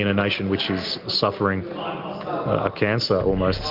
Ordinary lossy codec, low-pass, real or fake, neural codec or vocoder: Opus, 32 kbps; 5.4 kHz; real; none